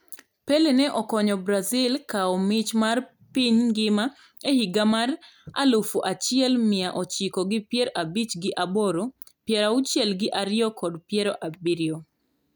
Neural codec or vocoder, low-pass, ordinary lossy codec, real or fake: none; none; none; real